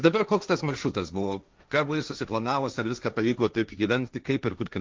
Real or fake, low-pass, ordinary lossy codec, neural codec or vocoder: fake; 7.2 kHz; Opus, 24 kbps; codec, 16 kHz, 1.1 kbps, Voila-Tokenizer